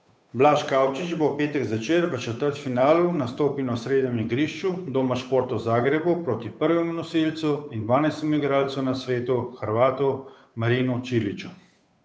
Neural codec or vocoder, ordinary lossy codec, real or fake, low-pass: codec, 16 kHz, 8 kbps, FunCodec, trained on Chinese and English, 25 frames a second; none; fake; none